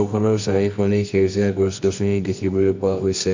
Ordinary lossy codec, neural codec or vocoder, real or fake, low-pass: MP3, 48 kbps; codec, 24 kHz, 0.9 kbps, WavTokenizer, medium music audio release; fake; 7.2 kHz